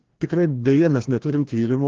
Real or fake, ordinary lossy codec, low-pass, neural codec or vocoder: fake; Opus, 16 kbps; 7.2 kHz; codec, 16 kHz, 1 kbps, FreqCodec, larger model